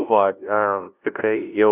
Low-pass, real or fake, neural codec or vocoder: 3.6 kHz; fake; codec, 16 kHz, 0.5 kbps, FunCodec, trained on LibriTTS, 25 frames a second